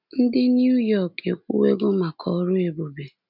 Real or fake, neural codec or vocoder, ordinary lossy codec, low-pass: real; none; none; 5.4 kHz